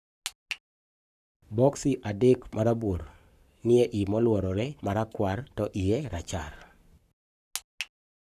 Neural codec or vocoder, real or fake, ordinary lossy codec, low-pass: codec, 44.1 kHz, 7.8 kbps, Pupu-Codec; fake; none; 14.4 kHz